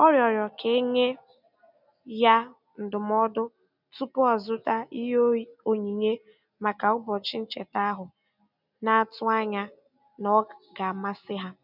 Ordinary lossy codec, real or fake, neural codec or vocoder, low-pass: none; real; none; 5.4 kHz